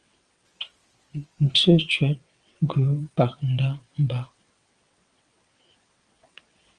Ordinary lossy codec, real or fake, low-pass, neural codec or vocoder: Opus, 32 kbps; fake; 9.9 kHz; vocoder, 22.05 kHz, 80 mel bands, WaveNeXt